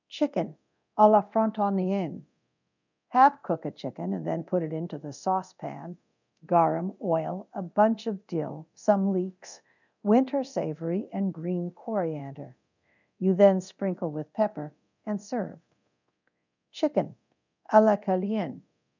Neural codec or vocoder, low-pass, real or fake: codec, 24 kHz, 0.9 kbps, DualCodec; 7.2 kHz; fake